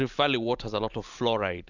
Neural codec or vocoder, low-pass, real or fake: none; 7.2 kHz; real